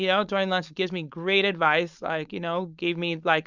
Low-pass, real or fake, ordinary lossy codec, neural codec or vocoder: 7.2 kHz; fake; Opus, 64 kbps; codec, 16 kHz, 4.8 kbps, FACodec